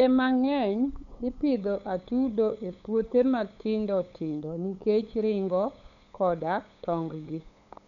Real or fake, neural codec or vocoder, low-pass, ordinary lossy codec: fake; codec, 16 kHz, 8 kbps, FunCodec, trained on LibriTTS, 25 frames a second; 7.2 kHz; none